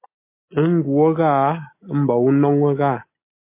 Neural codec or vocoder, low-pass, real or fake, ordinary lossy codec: none; 3.6 kHz; real; MP3, 24 kbps